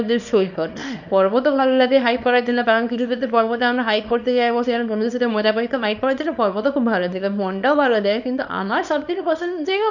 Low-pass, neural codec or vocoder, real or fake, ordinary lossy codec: 7.2 kHz; codec, 24 kHz, 0.9 kbps, WavTokenizer, small release; fake; none